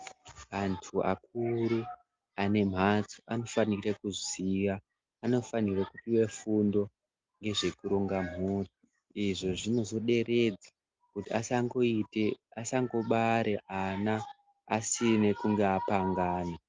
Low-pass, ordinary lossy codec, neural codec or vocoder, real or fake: 7.2 kHz; Opus, 32 kbps; none; real